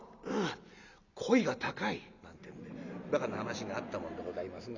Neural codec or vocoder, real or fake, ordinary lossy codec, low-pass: none; real; none; 7.2 kHz